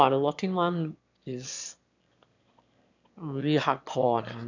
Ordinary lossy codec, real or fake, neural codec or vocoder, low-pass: none; fake; autoencoder, 22.05 kHz, a latent of 192 numbers a frame, VITS, trained on one speaker; 7.2 kHz